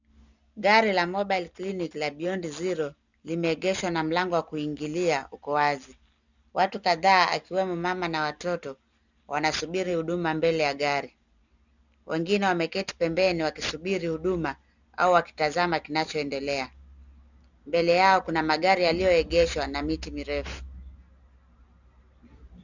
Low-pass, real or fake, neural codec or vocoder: 7.2 kHz; real; none